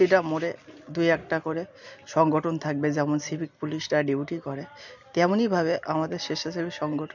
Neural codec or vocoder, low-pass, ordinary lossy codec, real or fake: none; 7.2 kHz; none; real